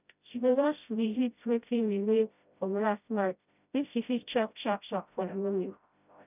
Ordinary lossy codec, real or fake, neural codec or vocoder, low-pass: none; fake; codec, 16 kHz, 0.5 kbps, FreqCodec, smaller model; 3.6 kHz